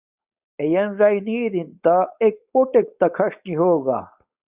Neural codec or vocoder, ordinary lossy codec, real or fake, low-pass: codec, 16 kHz, 4.8 kbps, FACodec; Opus, 64 kbps; fake; 3.6 kHz